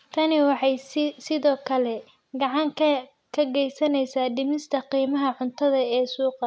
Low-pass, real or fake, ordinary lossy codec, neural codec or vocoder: none; real; none; none